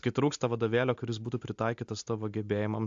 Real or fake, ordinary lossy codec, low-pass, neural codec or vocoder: real; AAC, 64 kbps; 7.2 kHz; none